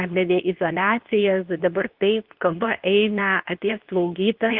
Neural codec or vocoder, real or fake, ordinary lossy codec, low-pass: codec, 24 kHz, 0.9 kbps, WavTokenizer, medium speech release version 2; fake; Opus, 24 kbps; 5.4 kHz